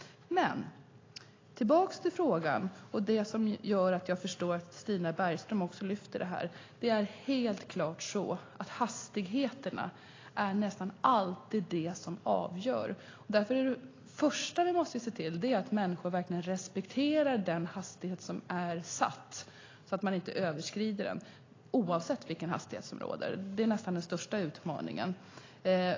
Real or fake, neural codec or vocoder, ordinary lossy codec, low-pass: real; none; AAC, 32 kbps; 7.2 kHz